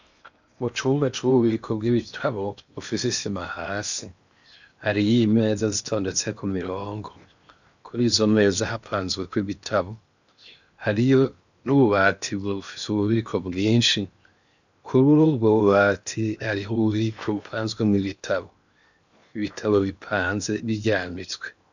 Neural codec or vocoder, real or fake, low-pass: codec, 16 kHz in and 24 kHz out, 0.8 kbps, FocalCodec, streaming, 65536 codes; fake; 7.2 kHz